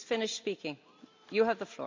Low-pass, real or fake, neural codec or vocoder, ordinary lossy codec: 7.2 kHz; real; none; MP3, 48 kbps